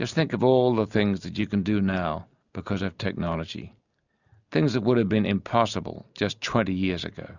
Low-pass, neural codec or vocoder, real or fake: 7.2 kHz; none; real